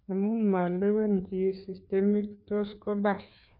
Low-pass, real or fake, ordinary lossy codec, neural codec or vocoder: 5.4 kHz; fake; none; codec, 16 kHz, 2 kbps, FreqCodec, larger model